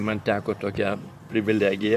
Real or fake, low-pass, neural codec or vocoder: fake; 14.4 kHz; vocoder, 44.1 kHz, 128 mel bands, Pupu-Vocoder